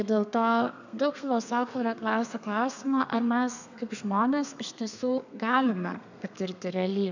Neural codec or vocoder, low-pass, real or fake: codec, 32 kHz, 1.9 kbps, SNAC; 7.2 kHz; fake